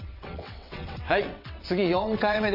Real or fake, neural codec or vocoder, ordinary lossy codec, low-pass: fake; vocoder, 22.05 kHz, 80 mel bands, WaveNeXt; none; 5.4 kHz